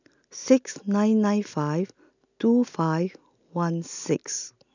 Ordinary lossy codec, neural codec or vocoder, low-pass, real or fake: none; none; 7.2 kHz; real